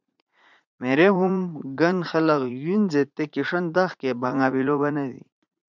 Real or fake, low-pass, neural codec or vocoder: fake; 7.2 kHz; vocoder, 44.1 kHz, 80 mel bands, Vocos